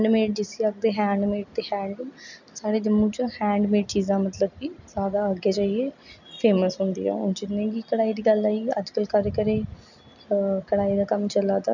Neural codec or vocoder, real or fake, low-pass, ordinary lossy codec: none; real; 7.2 kHz; none